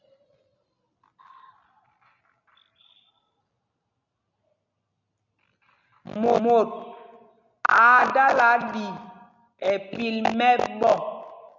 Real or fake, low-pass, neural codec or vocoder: real; 7.2 kHz; none